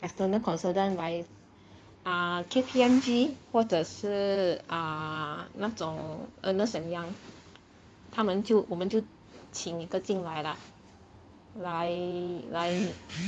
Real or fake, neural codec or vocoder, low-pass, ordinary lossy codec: fake; codec, 16 kHz in and 24 kHz out, 2.2 kbps, FireRedTTS-2 codec; 9.9 kHz; none